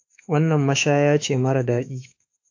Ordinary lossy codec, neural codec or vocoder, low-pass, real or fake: AAC, 48 kbps; autoencoder, 48 kHz, 32 numbers a frame, DAC-VAE, trained on Japanese speech; 7.2 kHz; fake